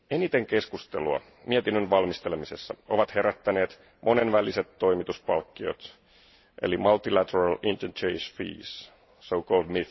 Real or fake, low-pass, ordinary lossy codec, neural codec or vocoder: real; 7.2 kHz; MP3, 24 kbps; none